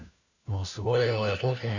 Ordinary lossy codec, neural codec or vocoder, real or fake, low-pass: none; codec, 16 kHz, 1 kbps, FunCodec, trained on LibriTTS, 50 frames a second; fake; 7.2 kHz